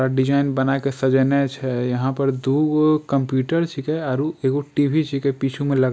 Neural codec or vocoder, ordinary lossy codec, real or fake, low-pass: none; none; real; none